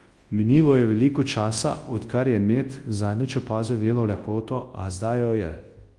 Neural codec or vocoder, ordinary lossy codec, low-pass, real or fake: codec, 24 kHz, 0.9 kbps, WavTokenizer, large speech release; Opus, 24 kbps; 10.8 kHz; fake